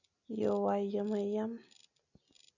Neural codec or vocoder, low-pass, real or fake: none; 7.2 kHz; real